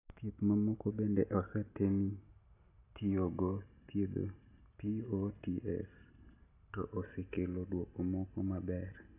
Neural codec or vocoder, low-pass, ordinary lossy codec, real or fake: none; 3.6 kHz; AAC, 24 kbps; real